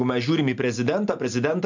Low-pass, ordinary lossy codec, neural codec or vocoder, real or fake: 7.2 kHz; MP3, 64 kbps; none; real